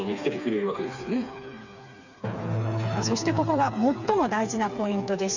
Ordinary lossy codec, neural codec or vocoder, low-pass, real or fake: none; codec, 16 kHz, 4 kbps, FreqCodec, smaller model; 7.2 kHz; fake